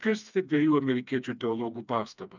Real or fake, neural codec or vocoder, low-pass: fake; codec, 16 kHz, 2 kbps, FreqCodec, smaller model; 7.2 kHz